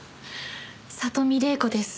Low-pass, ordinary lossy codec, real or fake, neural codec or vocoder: none; none; real; none